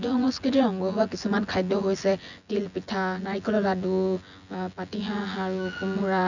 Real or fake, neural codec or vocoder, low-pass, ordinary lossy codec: fake; vocoder, 24 kHz, 100 mel bands, Vocos; 7.2 kHz; none